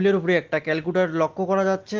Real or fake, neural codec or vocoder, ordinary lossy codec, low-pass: real; none; Opus, 16 kbps; 7.2 kHz